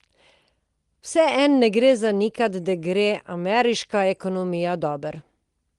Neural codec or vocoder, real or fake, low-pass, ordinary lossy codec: none; real; 10.8 kHz; Opus, 24 kbps